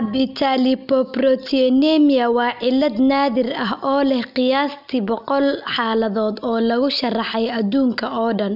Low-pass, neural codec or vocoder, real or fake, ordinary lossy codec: 5.4 kHz; none; real; none